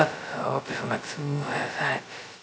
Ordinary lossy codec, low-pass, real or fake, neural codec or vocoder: none; none; fake; codec, 16 kHz, 0.2 kbps, FocalCodec